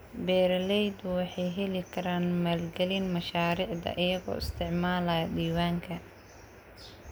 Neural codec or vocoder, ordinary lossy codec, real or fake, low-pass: none; none; real; none